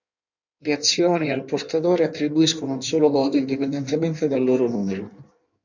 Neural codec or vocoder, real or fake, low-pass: codec, 16 kHz in and 24 kHz out, 1.1 kbps, FireRedTTS-2 codec; fake; 7.2 kHz